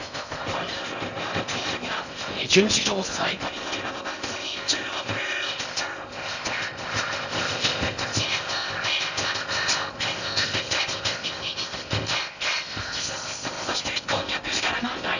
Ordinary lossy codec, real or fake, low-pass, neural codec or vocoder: none; fake; 7.2 kHz; codec, 16 kHz in and 24 kHz out, 0.6 kbps, FocalCodec, streaming, 4096 codes